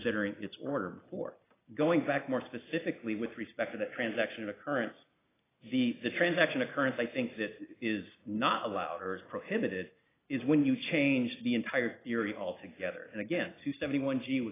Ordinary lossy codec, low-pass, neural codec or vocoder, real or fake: AAC, 16 kbps; 3.6 kHz; none; real